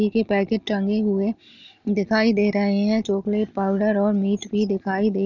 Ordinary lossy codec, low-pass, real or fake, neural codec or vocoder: none; 7.2 kHz; real; none